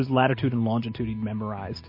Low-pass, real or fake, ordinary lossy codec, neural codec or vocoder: 5.4 kHz; real; MP3, 24 kbps; none